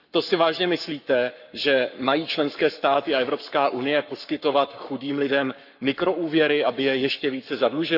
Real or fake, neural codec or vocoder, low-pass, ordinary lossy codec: fake; codec, 44.1 kHz, 7.8 kbps, Pupu-Codec; 5.4 kHz; none